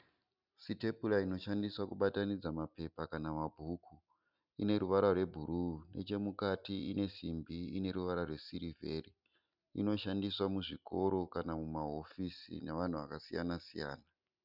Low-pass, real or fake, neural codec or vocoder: 5.4 kHz; real; none